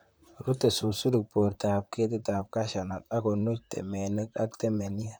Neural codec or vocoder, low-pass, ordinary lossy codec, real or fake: vocoder, 44.1 kHz, 128 mel bands, Pupu-Vocoder; none; none; fake